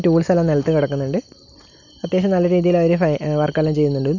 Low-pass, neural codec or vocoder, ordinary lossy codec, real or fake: 7.2 kHz; none; none; real